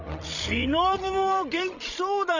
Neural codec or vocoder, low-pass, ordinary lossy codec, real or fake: codec, 16 kHz, 16 kbps, FreqCodec, larger model; 7.2 kHz; none; fake